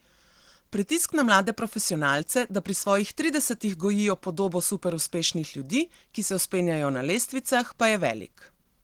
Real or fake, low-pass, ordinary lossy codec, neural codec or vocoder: real; 19.8 kHz; Opus, 16 kbps; none